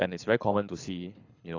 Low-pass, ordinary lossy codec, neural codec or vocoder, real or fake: 7.2 kHz; AAC, 32 kbps; codec, 24 kHz, 6 kbps, HILCodec; fake